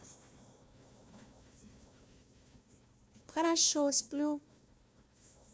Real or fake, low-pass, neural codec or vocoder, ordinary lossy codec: fake; none; codec, 16 kHz, 1 kbps, FunCodec, trained on Chinese and English, 50 frames a second; none